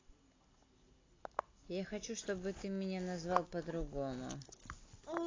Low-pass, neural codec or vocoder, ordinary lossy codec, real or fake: 7.2 kHz; none; AAC, 32 kbps; real